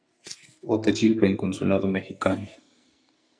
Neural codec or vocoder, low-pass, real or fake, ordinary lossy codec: codec, 44.1 kHz, 2.6 kbps, SNAC; 9.9 kHz; fake; MP3, 96 kbps